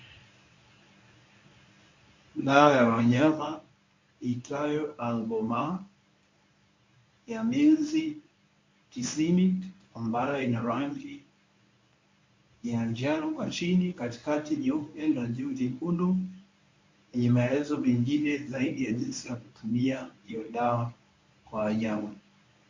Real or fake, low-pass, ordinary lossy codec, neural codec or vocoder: fake; 7.2 kHz; MP3, 48 kbps; codec, 24 kHz, 0.9 kbps, WavTokenizer, medium speech release version 1